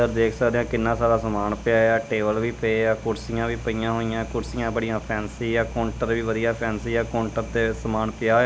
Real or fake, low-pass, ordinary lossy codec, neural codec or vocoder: real; none; none; none